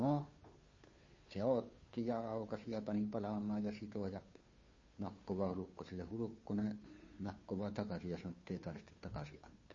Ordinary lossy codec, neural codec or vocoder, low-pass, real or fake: MP3, 32 kbps; codec, 16 kHz, 2 kbps, FunCodec, trained on Chinese and English, 25 frames a second; 7.2 kHz; fake